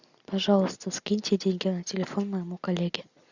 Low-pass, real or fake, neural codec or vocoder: 7.2 kHz; real; none